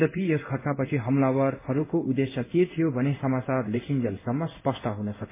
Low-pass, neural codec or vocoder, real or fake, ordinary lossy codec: 3.6 kHz; codec, 16 kHz in and 24 kHz out, 1 kbps, XY-Tokenizer; fake; MP3, 16 kbps